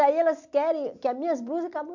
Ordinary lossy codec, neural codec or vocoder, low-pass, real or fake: none; none; 7.2 kHz; real